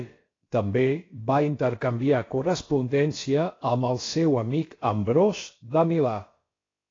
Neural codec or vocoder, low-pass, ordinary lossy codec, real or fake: codec, 16 kHz, about 1 kbps, DyCAST, with the encoder's durations; 7.2 kHz; AAC, 32 kbps; fake